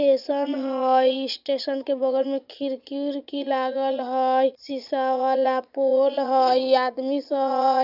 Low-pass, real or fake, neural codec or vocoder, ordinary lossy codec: 5.4 kHz; fake; vocoder, 22.05 kHz, 80 mel bands, Vocos; none